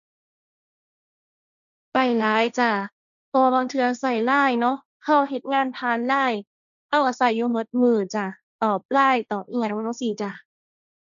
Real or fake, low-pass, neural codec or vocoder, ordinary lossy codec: fake; 7.2 kHz; codec, 16 kHz, 1.1 kbps, Voila-Tokenizer; none